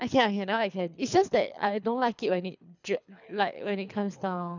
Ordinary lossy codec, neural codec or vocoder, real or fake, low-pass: none; codec, 24 kHz, 3 kbps, HILCodec; fake; 7.2 kHz